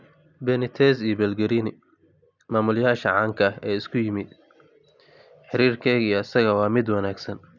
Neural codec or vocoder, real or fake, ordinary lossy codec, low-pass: none; real; none; 7.2 kHz